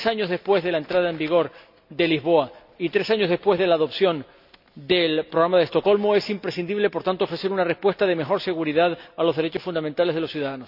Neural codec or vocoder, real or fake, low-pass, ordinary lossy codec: none; real; 5.4 kHz; none